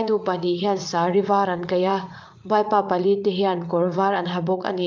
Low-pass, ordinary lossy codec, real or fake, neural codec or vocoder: 7.2 kHz; Opus, 24 kbps; fake; vocoder, 44.1 kHz, 80 mel bands, Vocos